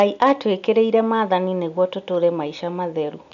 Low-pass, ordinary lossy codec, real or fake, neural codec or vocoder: 7.2 kHz; none; real; none